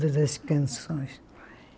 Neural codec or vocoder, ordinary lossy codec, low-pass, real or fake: none; none; none; real